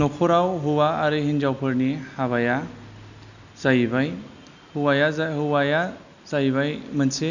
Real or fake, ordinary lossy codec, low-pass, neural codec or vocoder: real; none; 7.2 kHz; none